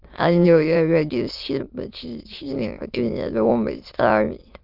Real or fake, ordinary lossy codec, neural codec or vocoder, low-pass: fake; Opus, 32 kbps; autoencoder, 22.05 kHz, a latent of 192 numbers a frame, VITS, trained on many speakers; 5.4 kHz